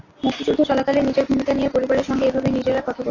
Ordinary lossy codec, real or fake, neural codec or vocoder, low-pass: AAC, 32 kbps; real; none; 7.2 kHz